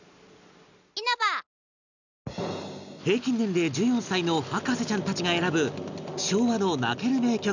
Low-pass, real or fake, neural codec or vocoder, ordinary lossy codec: 7.2 kHz; real; none; none